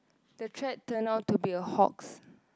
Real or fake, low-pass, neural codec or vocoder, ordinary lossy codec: real; none; none; none